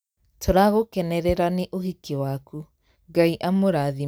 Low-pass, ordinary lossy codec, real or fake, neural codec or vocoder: none; none; real; none